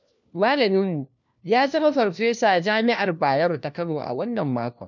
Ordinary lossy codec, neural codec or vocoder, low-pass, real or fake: none; codec, 16 kHz, 1 kbps, FunCodec, trained on LibriTTS, 50 frames a second; 7.2 kHz; fake